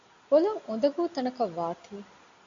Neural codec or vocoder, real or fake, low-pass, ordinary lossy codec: none; real; 7.2 kHz; AAC, 64 kbps